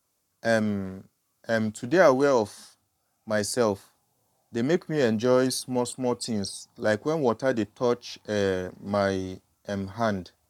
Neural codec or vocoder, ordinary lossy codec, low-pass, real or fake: codec, 44.1 kHz, 7.8 kbps, Pupu-Codec; none; 19.8 kHz; fake